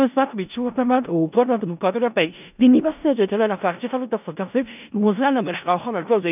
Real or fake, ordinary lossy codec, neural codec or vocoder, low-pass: fake; none; codec, 16 kHz in and 24 kHz out, 0.4 kbps, LongCat-Audio-Codec, four codebook decoder; 3.6 kHz